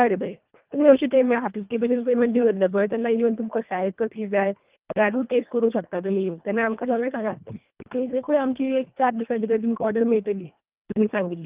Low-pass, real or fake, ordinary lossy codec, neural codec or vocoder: 3.6 kHz; fake; Opus, 24 kbps; codec, 24 kHz, 1.5 kbps, HILCodec